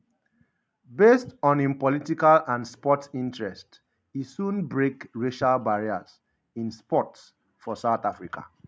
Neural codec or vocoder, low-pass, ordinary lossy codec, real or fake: none; none; none; real